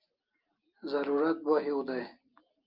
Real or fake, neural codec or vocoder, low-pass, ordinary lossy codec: real; none; 5.4 kHz; Opus, 32 kbps